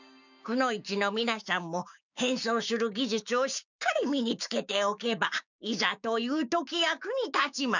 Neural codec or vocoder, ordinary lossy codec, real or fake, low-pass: codec, 16 kHz, 6 kbps, DAC; none; fake; 7.2 kHz